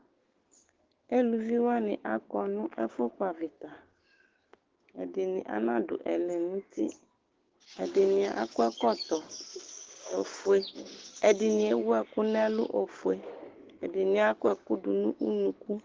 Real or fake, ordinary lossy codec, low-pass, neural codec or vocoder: fake; Opus, 16 kbps; 7.2 kHz; codec, 16 kHz, 6 kbps, DAC